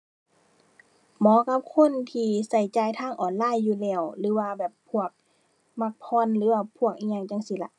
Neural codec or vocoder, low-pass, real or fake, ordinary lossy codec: none; 10.8 kHz; real; none